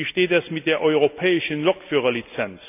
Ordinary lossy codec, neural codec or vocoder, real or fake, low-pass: none; none; real; 3.6 kHz